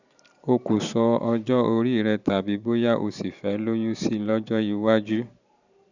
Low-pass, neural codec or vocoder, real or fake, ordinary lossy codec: 7.2 kHz; vocoder, 44.1 kHz, 128 mel bands every 512 samples, BigVGAN v2; fake; none